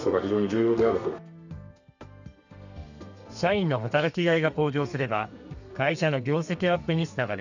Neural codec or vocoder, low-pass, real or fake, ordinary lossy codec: codec, 32 kHz, 1.9 kbps, SNAC; 7.2 kHz; fake; none